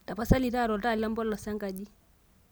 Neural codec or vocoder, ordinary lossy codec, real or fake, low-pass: none; none; real; none